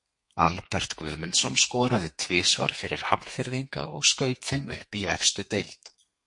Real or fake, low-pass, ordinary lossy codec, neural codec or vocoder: fake; 10.8 kHz; MP3, 48 kbps; codec, 32 kHz, 1.9 kbps, SNAC